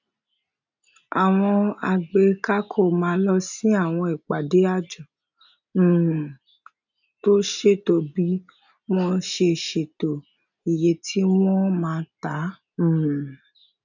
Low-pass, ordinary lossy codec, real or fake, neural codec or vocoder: 7.2 kHz; none; real; none